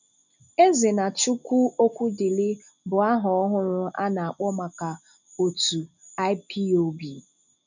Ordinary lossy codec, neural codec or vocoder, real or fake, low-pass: none; none; real; 7.2 kHz